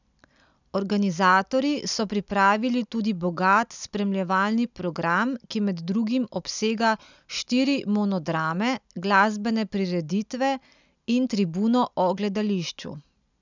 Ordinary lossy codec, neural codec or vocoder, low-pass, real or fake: none; none; 7.2 kHz; real